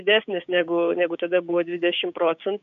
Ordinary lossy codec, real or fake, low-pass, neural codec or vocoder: AAC, 96 kbps; fake; 7.2 kHz; codec, 16 kHz, 6 kbps, DAC